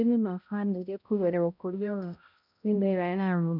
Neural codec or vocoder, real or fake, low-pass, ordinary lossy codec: codec, 16 kHz, 0.5 kbps, X-Codec, HuBERT features, trained on balanced general audio; fake; 5.4 kHz; none